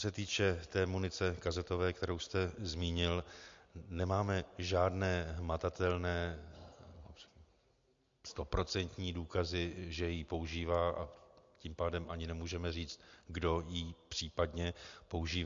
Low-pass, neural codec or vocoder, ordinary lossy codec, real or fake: 7.2 kHz; none; MP3, 48 kbps; real